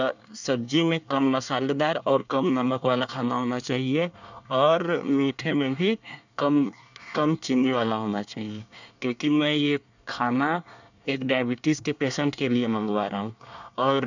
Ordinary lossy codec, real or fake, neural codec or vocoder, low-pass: none; fake; codec, 24 kHz, 1 kbps, SNAC; 7.2 kHz